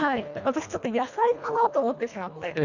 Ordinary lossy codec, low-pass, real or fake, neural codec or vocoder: none; 7.2 kHz; fake; codec, 24 kHz, 1.5 kbps, HILCodec